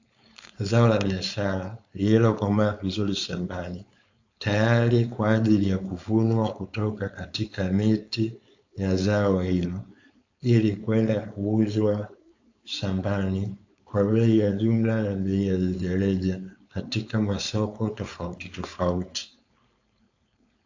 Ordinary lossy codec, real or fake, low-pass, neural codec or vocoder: AAC, 48 kbps; fake; 7.2 kHz; codec, 16 kHz, 4.8 kbps, FACodec